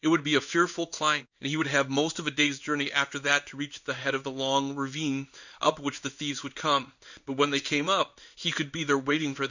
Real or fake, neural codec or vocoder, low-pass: fake; codec, 16 kHz in and 24 kHz out, 1 kbps, XY-Tokenizer; 7.2 kHz